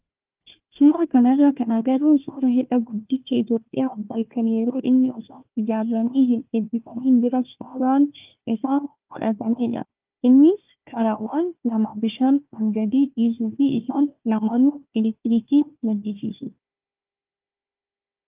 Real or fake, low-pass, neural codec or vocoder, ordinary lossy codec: fake; 3.6 kHz; codec, 16 kHz, 1 kbps, FunCodec, trained on Chinese and English, 50 frames a second; Opus, 32 kbps